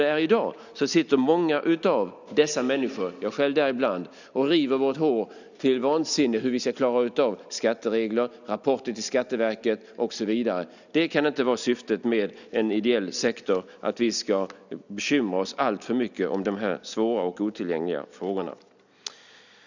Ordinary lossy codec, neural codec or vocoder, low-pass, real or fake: none; none; 7.2 kHz; real